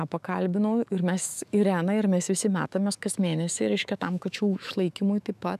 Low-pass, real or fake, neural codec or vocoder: 14.4 kHz; fake; autoencoder, 48 kHz, 128 numbers a frame, DAC-VAE, trained on Japanese speech